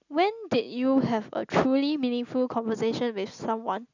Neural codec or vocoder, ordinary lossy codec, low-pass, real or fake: none; none; 7.2 kHz; real